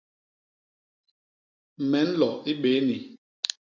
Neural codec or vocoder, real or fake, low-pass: none; real; 7.2 kHz